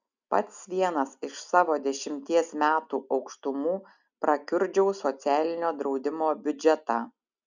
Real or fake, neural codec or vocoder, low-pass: real; none; 7.2 kHz